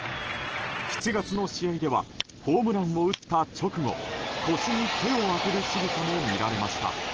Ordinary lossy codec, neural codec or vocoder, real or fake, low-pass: Opus, 16 kbps; none; real; 7.2 kHz